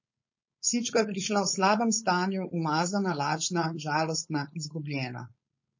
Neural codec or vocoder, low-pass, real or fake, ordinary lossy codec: codec, 16 kHz, 4.8 kbps, FACodec; 7.2 kHz; fake; MP3, 32 kbps